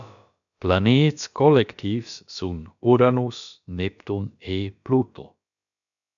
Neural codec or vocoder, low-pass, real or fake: codec, 16 kHz, about 1 kbps, DyCAST, with the encoder's durations; 7.2 kHz; fake